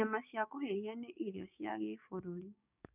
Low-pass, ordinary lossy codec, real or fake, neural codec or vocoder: 3.6 kHz; none; fake; codec, 16 kHz, 6 kbps, DAC